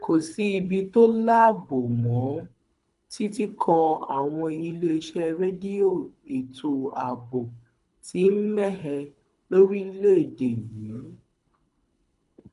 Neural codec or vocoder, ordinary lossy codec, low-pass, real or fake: codec, 24 kHz, 3 kbps, HILCodec; none; 10.8 kHz; fake